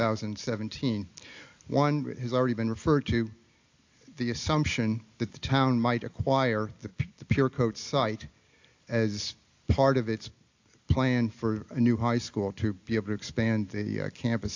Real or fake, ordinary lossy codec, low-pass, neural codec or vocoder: real; AAC, 48 kbps; 7.2 kHz; none